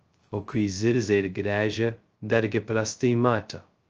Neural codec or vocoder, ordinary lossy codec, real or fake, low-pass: codec, 16 kHz, 0.2 kbps, FocalCodec; Opus, 24 kbps; fake; 7.2 kHz